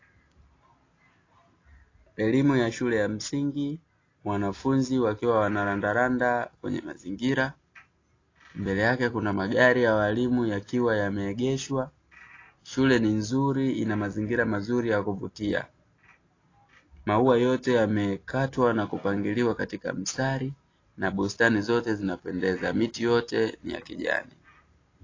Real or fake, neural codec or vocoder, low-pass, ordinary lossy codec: real; none; 7.2 kHz; AAC, 32 kbps